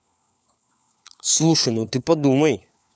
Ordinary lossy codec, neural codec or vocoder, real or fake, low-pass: none; codec, 16 kHz, 4 kbps, FunCodec, trained on LibriTTS, 50 frames a second; fake; none